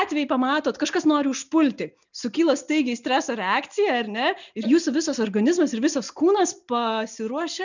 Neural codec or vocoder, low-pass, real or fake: none; 7.2 kHz; real